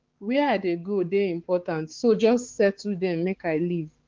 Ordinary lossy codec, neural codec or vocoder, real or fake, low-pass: Opus, 16 kbps; codec, 16 kHz, 4 kbps, X-Codec, HuBERT features, trained on balanced general audio; fake; 7.2 kHz